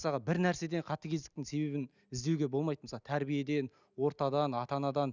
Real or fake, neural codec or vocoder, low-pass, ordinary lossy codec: real; none; 7.2 kHz; none